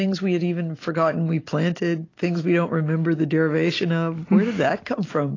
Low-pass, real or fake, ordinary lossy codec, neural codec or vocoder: 7.2 kHz; real; AAC, 32 kbps; none